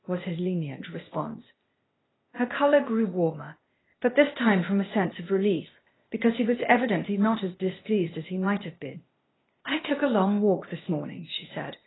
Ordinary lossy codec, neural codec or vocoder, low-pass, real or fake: AAC, 16 kbps; codec, 16 kHz, 0.8 kbps, ZipCodec; 7.2 kHz; fake